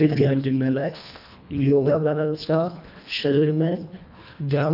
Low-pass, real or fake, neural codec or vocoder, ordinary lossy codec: 5.4 kHz; fake; codec, 24 kHz, 1.5 kbps, HILCodec; none